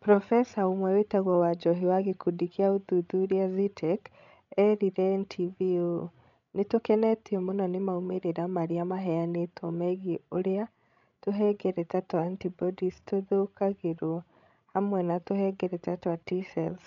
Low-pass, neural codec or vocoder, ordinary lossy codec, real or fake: 7.2 kHz; codec, 16 kHz, 16 kbps, FreqCodec, larger model; none; fake